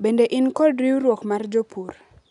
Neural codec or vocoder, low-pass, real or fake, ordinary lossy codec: none; 10.8 kHz; real; none